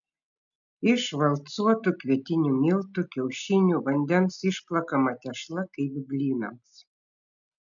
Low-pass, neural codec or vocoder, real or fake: 7.2 kHz; none; real